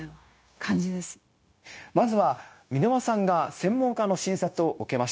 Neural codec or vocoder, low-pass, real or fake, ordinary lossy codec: codec, 16 kHz, 0.9 kbps, LongCat-Audio-Codec; none; fake; none